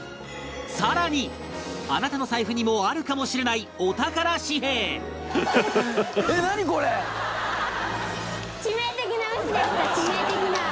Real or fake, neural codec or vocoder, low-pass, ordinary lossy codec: real; none; none; none